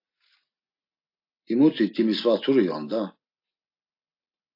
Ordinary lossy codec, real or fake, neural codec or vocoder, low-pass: AAC, 32 kbps; real; none; 5.4 kHz